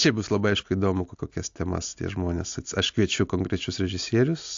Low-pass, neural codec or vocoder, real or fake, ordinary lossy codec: 7.2 kHz; none; real; AAC, 64 kbps